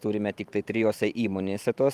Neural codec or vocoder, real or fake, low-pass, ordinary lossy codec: vocoder, 44.1 kHz, 128 mel bands every 512 samples, BigVGAN v2; fake; 14.4 kHz; Opus, 32 kbps